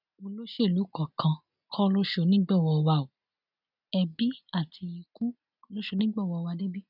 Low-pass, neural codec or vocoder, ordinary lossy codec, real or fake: 5.4 kHz; none; none; real